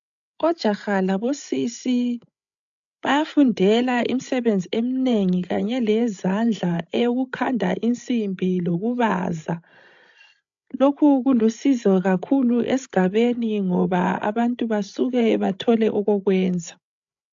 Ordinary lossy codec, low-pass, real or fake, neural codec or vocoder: AAC, 64 kbps; 7.2 kHz; fake; codec, 16 kHz, 16 kbps, FreqCodec, larger model